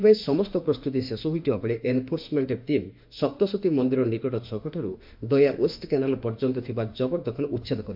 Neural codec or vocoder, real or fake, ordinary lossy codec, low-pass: autoencoder, 48 kHz, 32 numbers a frame, DAC-VAE, trained on Japanese speech; fake; MP3, 48 kbps; 5.4 kHz